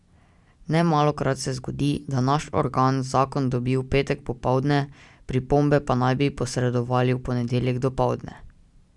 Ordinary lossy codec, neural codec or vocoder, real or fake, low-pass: none; none; real; 10.8 kHz